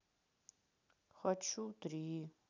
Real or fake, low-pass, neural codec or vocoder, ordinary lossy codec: real; 7.2 kHz; none; none